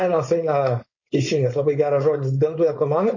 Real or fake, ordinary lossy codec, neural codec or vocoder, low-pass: fake; MP3, 32 kbps; codec, 16 kHz, 4.8 kbps, FACodec; 7.2 kHz